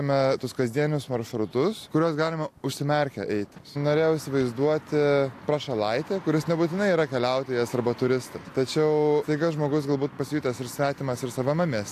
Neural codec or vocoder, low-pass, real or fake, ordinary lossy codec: none; 14.4 kHz; real; AAC, 64 kbps